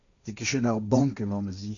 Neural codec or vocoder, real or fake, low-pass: codec, 16 kHz, 1.1 kbps, Voila-Tokenizer; fake; 7.2 kHz